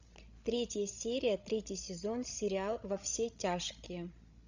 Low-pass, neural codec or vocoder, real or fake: 7.2 kHz; codec, 16 kHz, 16 kbps, FreqCodec, larger model; fake